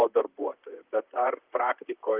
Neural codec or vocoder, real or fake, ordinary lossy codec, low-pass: vocoder, 44.1 kHz, 128 mel bands, Pupu-Vocoder; fake; Opus, 24 kbps; 3.6 kHz